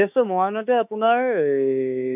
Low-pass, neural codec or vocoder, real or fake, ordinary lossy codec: 3.6 kHz; autoencoder, 48 kHz, 32 numbers a frame, DAC-VAE, trained on Japanese speech; fake; none